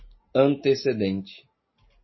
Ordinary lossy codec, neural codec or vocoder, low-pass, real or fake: MP3, 24 kbps; none; 7.2 kHz; real